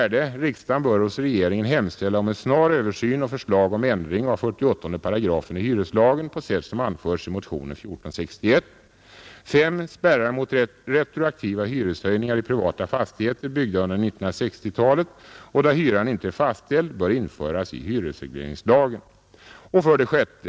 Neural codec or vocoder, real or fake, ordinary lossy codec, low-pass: none; real; none; none